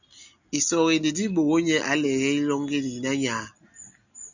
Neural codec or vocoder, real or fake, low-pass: none; real; 7.2 kHz